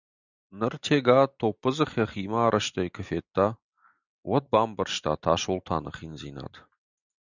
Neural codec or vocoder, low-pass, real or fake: none; 7.2 kHz; real